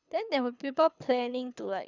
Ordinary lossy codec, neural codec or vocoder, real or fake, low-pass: none; codec, 24 kHz, 6 kbps, HILCodec; fake; 7.2 kHz